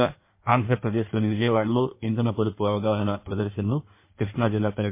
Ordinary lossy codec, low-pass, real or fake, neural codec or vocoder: MP3, 24 kbps; 3.6 kHz; fake; codec, 16 kHz in and 24 kHz out, 1.1 kbps, FireRedTTS-2 codec